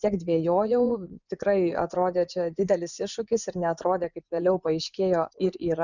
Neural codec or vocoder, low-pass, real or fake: vocoder, 24 kHz, 100 mel bands, Vocos; 7.2 kHz; fake